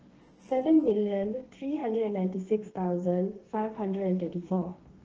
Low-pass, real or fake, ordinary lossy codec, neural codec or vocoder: 7.2 kHz; fake; Opus, 16 kbps; codec, 32 kHz, 1.9 kbps, SNAC